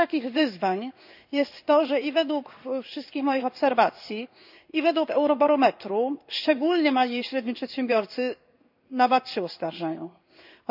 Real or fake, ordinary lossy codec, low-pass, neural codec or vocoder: fake; none; 5.4 kHz; codec, 16 kHz in and 24 kHz out, 1 kbps, XY-Tokenizer